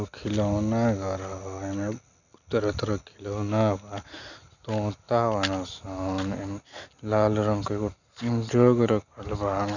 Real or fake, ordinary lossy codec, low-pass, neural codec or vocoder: real; none; 7.2 kHz; none